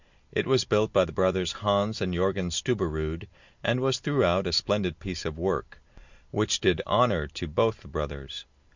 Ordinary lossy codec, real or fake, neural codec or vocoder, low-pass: Opus, 64 kbps; real; none; 7.2 kHz